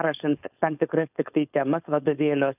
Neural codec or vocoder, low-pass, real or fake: codec, 16 kHz, 4.8 kbps, FACodec; 3.6 kHz; fake